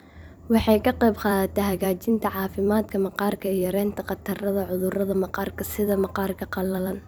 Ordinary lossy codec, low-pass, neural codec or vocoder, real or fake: none; none; vocoder, 44.1 kHz, 128 mel bands every 256 samples, BigVGAN v2; fake